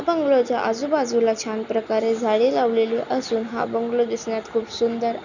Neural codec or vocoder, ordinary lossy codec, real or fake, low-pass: none; none; real; 7.2 kHz